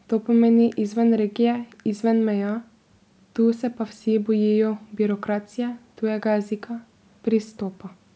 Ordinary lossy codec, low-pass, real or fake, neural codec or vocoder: none; none; real; none